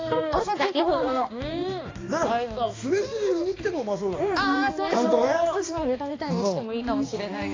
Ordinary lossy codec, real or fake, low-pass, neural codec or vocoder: AAC, 32 kbps; fake; 7.2 kHz; codec, 16 kHz, 2 kbps, X-Codec, HuBERT features, trained on balanced general audio